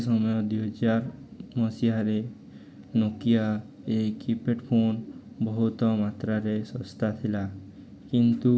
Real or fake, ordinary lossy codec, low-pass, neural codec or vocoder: real; none; none; none